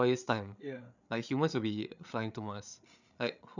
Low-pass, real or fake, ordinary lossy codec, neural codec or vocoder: 7.2 kHz; fake; none; codec, 16 kHz, 8 kbps, FreqCodec, larger model